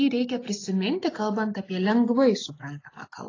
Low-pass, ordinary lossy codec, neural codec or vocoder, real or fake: 7.2 kHz; AAC, 32 kbps; none; real